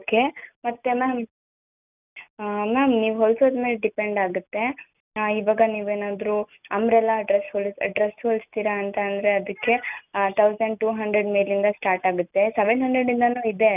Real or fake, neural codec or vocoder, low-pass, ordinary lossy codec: real; none; 3.6 kHz; none